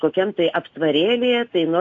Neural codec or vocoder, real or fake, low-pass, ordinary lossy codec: none; real; 7.2 kHz; AAC, 48 kbps